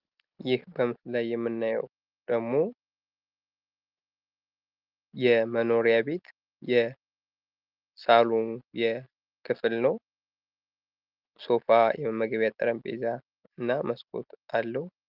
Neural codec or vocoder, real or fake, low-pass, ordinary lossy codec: none; real; 5.4 kHz; Opus, 32 kbps